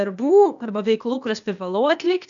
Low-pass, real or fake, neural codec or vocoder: 7.2 kHz; fake; codec, 16 kHz, 0.8 kbps, ZipCodec